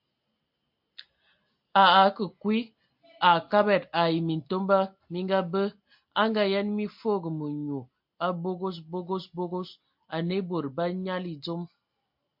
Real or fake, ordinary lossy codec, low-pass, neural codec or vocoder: real; MP3, 48 kbps; 5.4 kHz; none